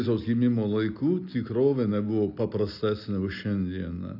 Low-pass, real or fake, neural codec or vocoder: 5.4 kHz; real; none